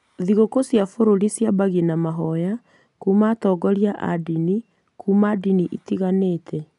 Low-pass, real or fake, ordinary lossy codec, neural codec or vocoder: 10.8 kHz; real; none; none